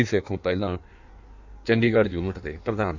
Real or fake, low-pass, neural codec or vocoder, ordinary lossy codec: fake; 7.2 kHz; codec, 16 kHz in and 24 kHz out, 1.1 kbps, FireRedTTS-2 codec; Opus, 64 kbps